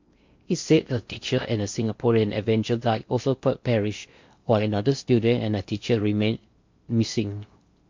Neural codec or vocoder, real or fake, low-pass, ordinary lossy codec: codec, 16 kHz in and 24 kHz out, 0.6 kbps, FocalCodec, streaming, 4096 codes; fake; 7.2 kHz; MP3, 48 kbps